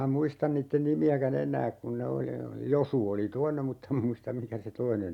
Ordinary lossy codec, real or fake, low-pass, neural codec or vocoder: none; real; 19.8 kHz; none